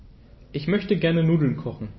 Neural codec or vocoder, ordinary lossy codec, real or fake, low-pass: none; MP3, 24 kbps; real; 7.2 kHz